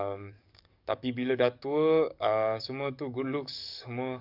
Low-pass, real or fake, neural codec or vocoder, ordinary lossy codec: 5.4 kHz; fake; codec, 44.1 kHz, 7.8 kbps, DAC; none